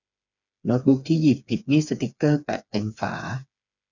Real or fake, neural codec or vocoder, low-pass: fake; codec, 16 kHz, 4 kbps, FreqCodec, smaller model; 7.2 kHz